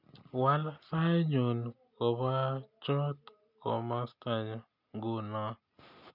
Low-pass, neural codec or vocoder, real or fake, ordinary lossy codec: 5.4 kHz; none; real; none